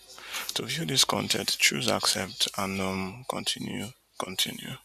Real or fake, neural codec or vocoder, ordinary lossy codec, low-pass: real; none; none; 14.4 kHz